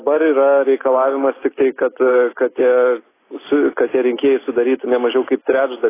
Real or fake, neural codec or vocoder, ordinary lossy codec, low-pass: real; none; AAC, 16 kbps; 3.6 kHz